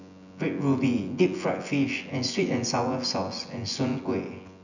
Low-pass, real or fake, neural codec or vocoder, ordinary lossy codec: 7.2 kHz; fake; vocoder, 24 kHz, 100 mel bands, Vocos; none